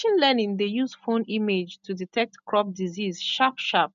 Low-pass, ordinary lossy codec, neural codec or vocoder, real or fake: 7.2 kHz; MP3, 64 kbps; none; real